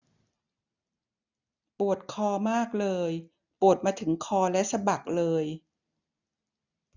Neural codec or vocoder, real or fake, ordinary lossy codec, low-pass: none; real; none; 7.2 kHz